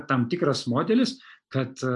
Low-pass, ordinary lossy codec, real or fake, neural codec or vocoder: 10.8 kHz; AAC, 64 kbps; real; none